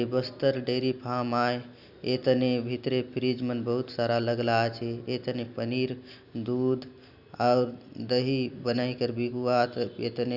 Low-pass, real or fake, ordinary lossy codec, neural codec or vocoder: 5.4 kHz; real; none; none